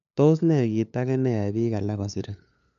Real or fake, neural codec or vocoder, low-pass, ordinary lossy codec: fake; codec, 16 kHz, 2 kbps, FunCodec, trained on LibriTTS, 25 frames a second; 7.2 kHz; none